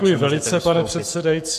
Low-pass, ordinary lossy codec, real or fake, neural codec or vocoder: 14.4 kHz; MP3, 96 kbps; fake; codec, 44.1 kHz, 7.8 kbps, Pupu-Codec